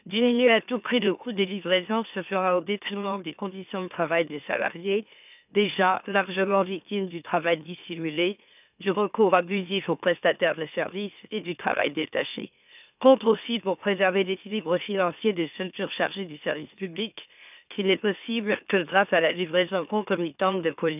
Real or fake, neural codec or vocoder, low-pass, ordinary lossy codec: fake; autoencoder, 44.1 kHz, a latent of 192 numbers a frame, MeloTTS; 3.6 kHz; none